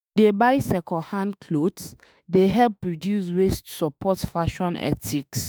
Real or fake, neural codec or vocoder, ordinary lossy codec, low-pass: fake; autoencoder, 48 kHz, 32 numbers a frame, DAC-VAE, trained on Japanese speech; none; none